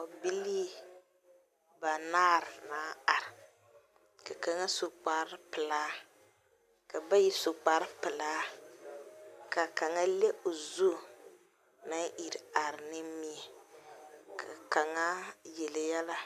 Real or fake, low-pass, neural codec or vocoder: real; 14.4 kHz; none